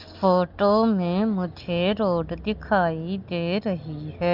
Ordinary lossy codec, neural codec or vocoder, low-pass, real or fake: Opus, 32 kbps; none; 5.4 kHz; real